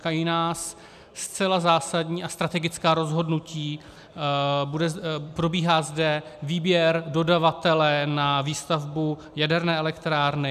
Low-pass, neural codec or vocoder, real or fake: 14.4 kHz; none; real